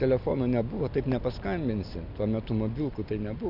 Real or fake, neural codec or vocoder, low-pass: real; none; 5.4 kHz